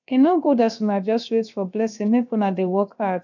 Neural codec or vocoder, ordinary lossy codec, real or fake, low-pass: codec, 16 kHz, 0.7 kbps, FocalCodec; none; fake; 7.2 kHz